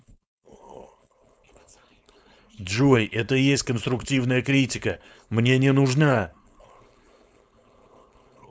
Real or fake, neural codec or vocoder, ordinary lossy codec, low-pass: fake; codec, 16 kHz, 4.8 kbps, FACodec; none; none